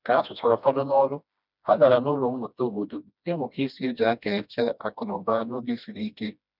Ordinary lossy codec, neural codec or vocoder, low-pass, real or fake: none; codec, 16 kHz, 1 kbps, FreqCodec, smaller model; 5.4 kHz; fake